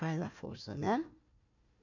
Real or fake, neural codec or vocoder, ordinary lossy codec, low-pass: fake; codec, 16 kHz, 1 kbps, FunCodec, trained on Chinese and English, 50 frames a second; none; 7.2 kHz